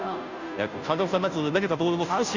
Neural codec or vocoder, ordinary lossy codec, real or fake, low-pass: codec, 16 kHz, 0.5 kbps, FunCodec, trained on Chinese and English, 25 frames a second; none; fake; 7.2 kHz